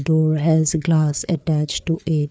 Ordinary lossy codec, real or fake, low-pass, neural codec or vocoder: none; fake; none; codec, 16 kHz, 4 kbps, FreqCodec, larger model